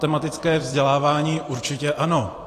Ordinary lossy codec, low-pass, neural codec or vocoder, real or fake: AAC, 48 kbps; 14.4 kHz; vocoder, 44.1 kHz, 128 mel bands every 512 samples, BigVGAN v2; fake